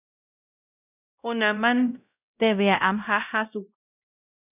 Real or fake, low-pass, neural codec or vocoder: fake; 3.6 kHz; codec, 16 kHz, 0.5 kbps, X-Codec, WavLM features, trained on Multilingual LibriSpeech